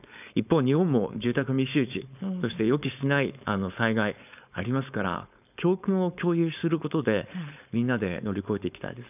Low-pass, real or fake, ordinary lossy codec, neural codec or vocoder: 3.6 kHz; fake; none; codec, 16 kHz, 4.8 kbps, FACodec